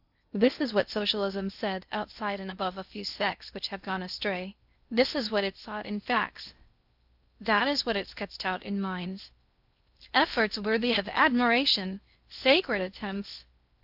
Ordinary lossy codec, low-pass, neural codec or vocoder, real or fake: AAC, 48 kbps; 5.4 kHz; codec, 16 kHz in and 24 kHz out, 0.6 kbps, FocalCodec, streaming, 2048 codes; fake